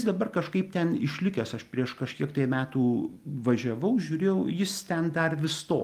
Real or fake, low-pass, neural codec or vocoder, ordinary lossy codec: real; 14.4 kHz; none; Opus, 32 kbps